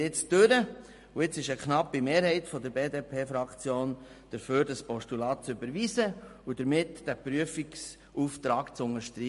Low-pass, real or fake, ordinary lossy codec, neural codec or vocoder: 14.4 kHz; real; MP3, 48 kbps; none